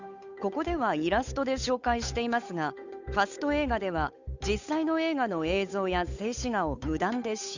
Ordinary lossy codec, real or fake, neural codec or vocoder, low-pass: none; fake; codec, 16 kHz, 8 kbps, FunCodec, trained on Chinese and English, 25 frames a second; 7.2 kHz